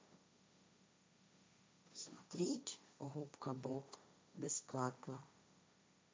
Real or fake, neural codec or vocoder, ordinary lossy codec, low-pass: fake; codec, 16 kHz, 1.1 kbps, Voila-Tokenizer; none; none